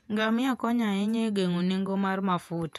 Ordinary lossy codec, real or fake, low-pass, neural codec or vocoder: none; fake; 14.4 kHz; vocoder, 48 kHz, 128 mel bands, Vocos